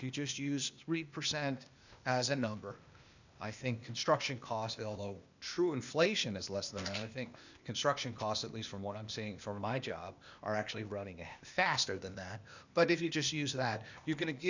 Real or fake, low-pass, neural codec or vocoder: fake; 7.2 kHz; codec, 16 kHz, 0.8 kbps, ZipCodec